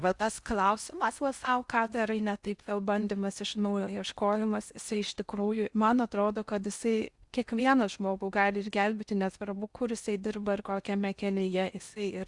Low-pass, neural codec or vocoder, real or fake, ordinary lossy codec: 10.8 kHz; codec, 16 kHz in and 24 kHz out, 0.8 kbps, FocalCodec, streaming, 65536 codes; fake; Opus, 64 kbps